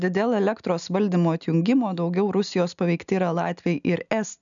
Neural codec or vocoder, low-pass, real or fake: none; 7.2 kHz; real